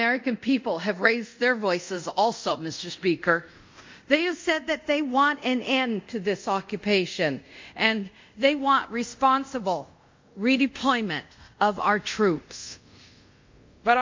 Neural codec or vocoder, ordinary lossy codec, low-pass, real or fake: codec, 24 kHz, 0.5 kbps, DualCodec; MP3, 48 kbps; 7.2 kHz; fake